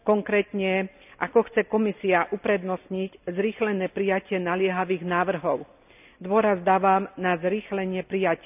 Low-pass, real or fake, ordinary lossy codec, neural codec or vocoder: 3.6 kHz; real; none; none